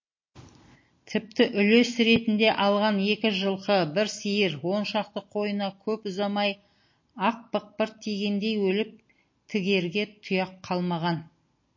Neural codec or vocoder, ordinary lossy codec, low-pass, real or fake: none; MP3, 32 kbps; 7.2 kHz; real